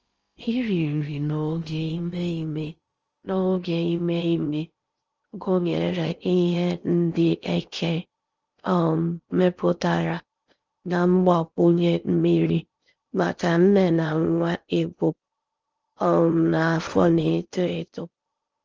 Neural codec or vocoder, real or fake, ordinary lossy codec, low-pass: codec, 16 kHz in and 24 kHz out, 0.6 kbps, FocalCodec, streaming, 4096 codes; fake; Opus, 24 kbps; 7.2 kHz